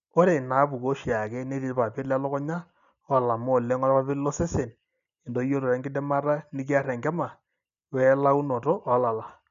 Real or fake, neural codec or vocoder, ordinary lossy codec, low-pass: real; none; none; 7.2 kHz